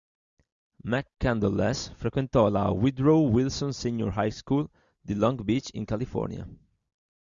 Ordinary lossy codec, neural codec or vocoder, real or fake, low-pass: Opus, 64 kbps; none; real; 7.2 kHz